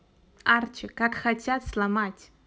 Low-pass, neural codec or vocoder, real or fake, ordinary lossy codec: none; none; real; none